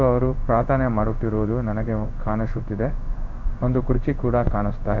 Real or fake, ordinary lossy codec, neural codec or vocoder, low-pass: fake; none; codec, 16 kHz in and 24 kHz out, 1 kbps, XY-Tokenizer; 7.2 kHz